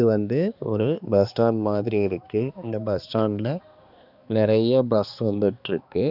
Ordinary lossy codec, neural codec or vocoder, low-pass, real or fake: none; codec, 16 kHz, 2 kbps, X-Codec, HuBERT features, trained on balanced general audio; 5.4 kHz; fake